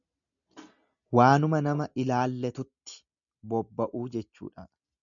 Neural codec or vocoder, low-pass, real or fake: none; 7.2 kHz; real